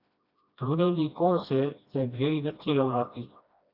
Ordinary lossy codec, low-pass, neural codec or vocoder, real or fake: Opus, 64 kbps; 5.4 kHz; codec, 16 kHz, 1 kbps, FreqCodec, smaller model; fake